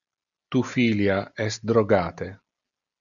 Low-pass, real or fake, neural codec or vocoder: 7.2 kHz; real; none